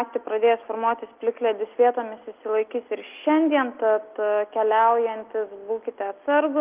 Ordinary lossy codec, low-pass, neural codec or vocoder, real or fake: Opus, 32 kbps; 3.6 kHz; none; real